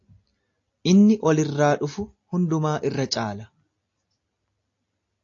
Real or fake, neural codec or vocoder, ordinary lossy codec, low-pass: real; none; AAC, 48 kbps; 7.2 kHz